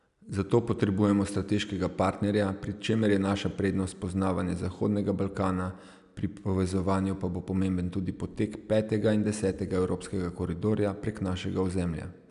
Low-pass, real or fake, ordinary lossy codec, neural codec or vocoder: 10.8 kHz; real; none; none